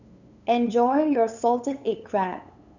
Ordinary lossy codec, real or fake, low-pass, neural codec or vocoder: none; fake; 7.2 kHz; codec, 16 kHz, 8 kbps, FunCodec, trained on LibriTTS, 25 frames a second